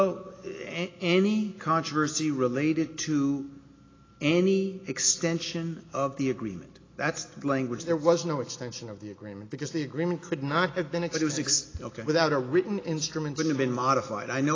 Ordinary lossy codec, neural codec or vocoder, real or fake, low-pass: AAC, 32 kbps; none; real; 7.2 kHz